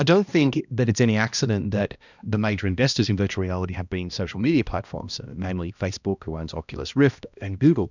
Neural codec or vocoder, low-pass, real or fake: codec, 16 kHz, 1 kbps, X-Codec, HuBERT features, trained on balanced general audio; 7.2 kHz; fake